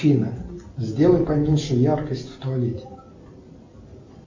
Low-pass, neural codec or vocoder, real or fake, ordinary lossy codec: 7.2 kHz; none; real; MP3, 48 kbps